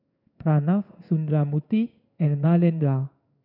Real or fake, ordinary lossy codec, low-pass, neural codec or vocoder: fake; none; 5.4 kHz; codec, 16 kHz in and 24 kHz out, 1 kbps, XY-Tokenizer